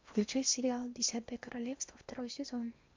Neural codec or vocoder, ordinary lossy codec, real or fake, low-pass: codec, 16 kHz in and 24 kHz out, 0.8 kbps, FocalCodec, streaming, 65536 codes; MP3, 64 kbps; fake; 7.2 kHz